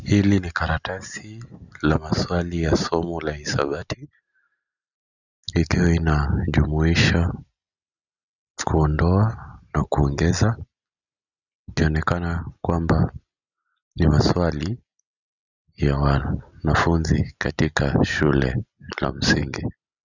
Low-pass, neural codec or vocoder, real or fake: 7.2 kHz; none; real